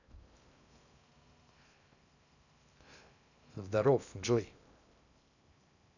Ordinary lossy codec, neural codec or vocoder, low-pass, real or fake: none; codec, 16 kHz in and 24 kHz out, 0.6 kbps, FocalCodec, streaming, 2048 codes; 7.2 kHz; fake